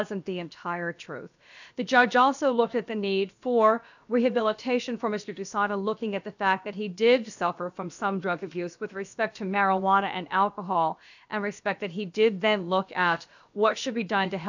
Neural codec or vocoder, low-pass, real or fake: codec, 16 kHz, about 1 kbps, DyCAST, with the encoder's durations; 7.2 kHz; fake